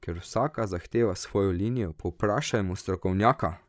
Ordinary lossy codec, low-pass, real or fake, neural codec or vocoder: none; none; fake; codec, 16 kHz, 16 kbps, FreqCodec, larger model